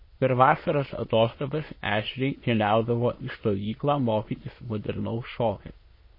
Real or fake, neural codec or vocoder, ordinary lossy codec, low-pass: fake; autoencoder, 22.05 kHz, a latent of 192 numbers a frame, VITS, trained on many speakers; MP3, 24 kbps; 5.4 kHz